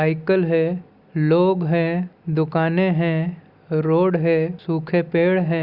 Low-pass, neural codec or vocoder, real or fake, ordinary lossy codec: 5.4 kHz; none; real; Opus, 64 kbps